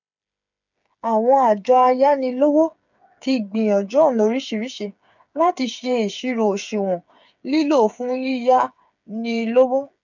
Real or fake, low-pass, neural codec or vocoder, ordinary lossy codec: fake; 7.2 kHz; codec, 16 kHz, 8 kbps, FreqCodec, smaller model; none